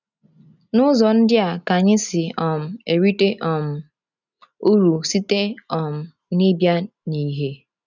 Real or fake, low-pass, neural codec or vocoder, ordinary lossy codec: real; 7.2 kHz; none; none